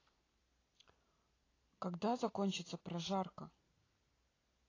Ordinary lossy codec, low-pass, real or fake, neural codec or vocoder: AAC, 32 kbps; 7.2 kHz; real; none